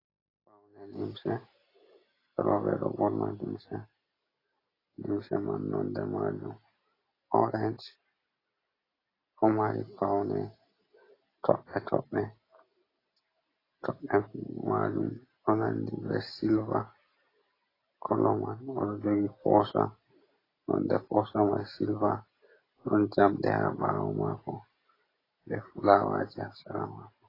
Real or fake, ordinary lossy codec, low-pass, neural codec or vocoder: real; AAC, 24 kbps; 5.4 kHz; none